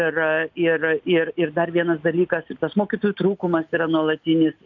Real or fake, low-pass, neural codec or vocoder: real; 7.2 kHz; none